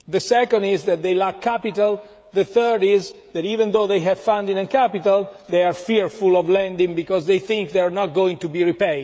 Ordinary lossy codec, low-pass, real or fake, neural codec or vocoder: none; none; fake; codec, 16 kHz, 16 kbps, FreqCodec, smaller model